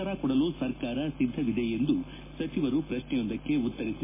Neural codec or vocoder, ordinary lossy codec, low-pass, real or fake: none; MP3, 16 kbps; 3.6 kHz; real